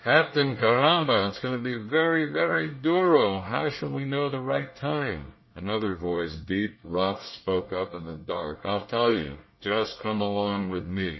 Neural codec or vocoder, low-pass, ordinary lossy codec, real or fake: codec, 24 kHz, 1 kbps, SNAC; 7.2 kHz; MP3, 24 kbps; fake